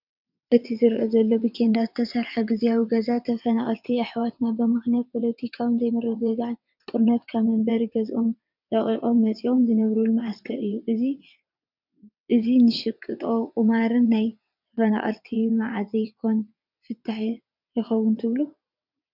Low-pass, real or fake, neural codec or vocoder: 5.4 kHz; fake; vocoder, 22.05 kHz, 80 mel bands, WaveNeXt